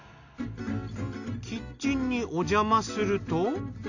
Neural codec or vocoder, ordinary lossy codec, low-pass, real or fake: none; none; 7.2 kHz; real